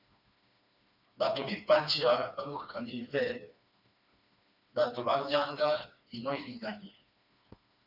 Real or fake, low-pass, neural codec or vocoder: fake; 5.4 kHz; codec, 16 kHz, 2 kbps, FreqCodec, smaller model